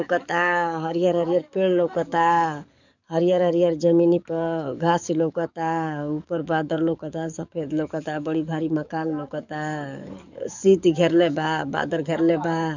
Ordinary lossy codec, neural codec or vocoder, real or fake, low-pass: none; codec, 44.1 kHz, 7.8 kbps, DAC; fake; 7.2 kHz